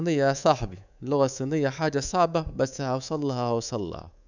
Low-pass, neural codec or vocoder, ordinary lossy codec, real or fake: 7.2 kHz; codec, 24 kHz, 3.1 kbps, DualCodec; none; fake